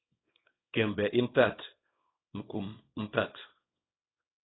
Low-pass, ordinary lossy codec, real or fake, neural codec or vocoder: 7.2 kHz; AAC, 16 kbps; fake; codec, 24 kHz, 0.9 kbps, WavTokenizer, small release